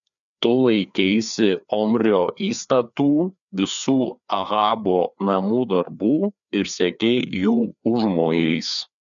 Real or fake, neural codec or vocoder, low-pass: fake; codec, 16 kHz, 2 kbps, FreqCodec, larger model; 7.2 kHz